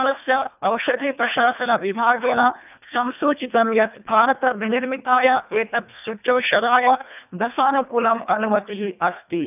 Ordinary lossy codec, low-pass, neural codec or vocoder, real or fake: none; 3.6 kHz; codec, 24 kHz, 1.5 kbps, HILCodec; fake